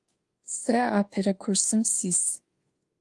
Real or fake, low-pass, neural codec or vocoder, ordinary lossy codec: fake; 10.8 kHz; codec, 24 kHz, 1.2 kbps, DualCodec; Opus, 24 kbps